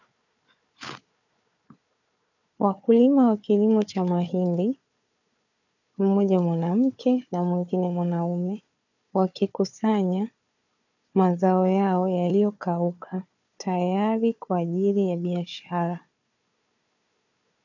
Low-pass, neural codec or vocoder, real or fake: 7.2 kHz; codec, 16 kHz, 4 kbps, FunCodec, trained on Chinese and English, 50 frames a second; fake